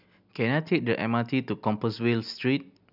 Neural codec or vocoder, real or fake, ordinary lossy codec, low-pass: none; real; none; 5.4 kHz